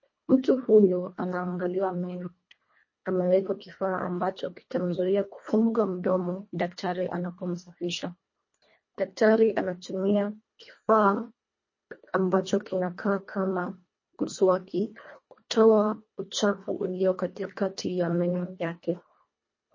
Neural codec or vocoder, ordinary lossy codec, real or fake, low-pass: codec, 24 kHz, 1.5 kbps, HILCodec; MP3, 32 kbps; fake; 7.2 kHz